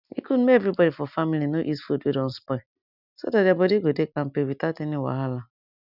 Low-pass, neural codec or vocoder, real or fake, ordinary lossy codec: 5.4 kHz; none; real; none